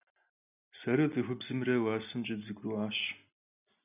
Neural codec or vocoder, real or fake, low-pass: none; real; 3.6 kHz